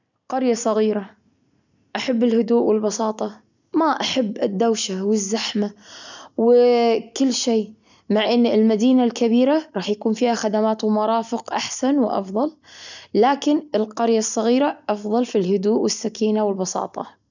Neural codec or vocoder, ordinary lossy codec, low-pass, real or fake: none; none; 7.2 kHz; real